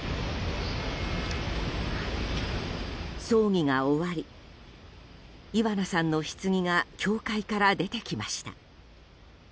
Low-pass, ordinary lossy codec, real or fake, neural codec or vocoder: none; none; real; none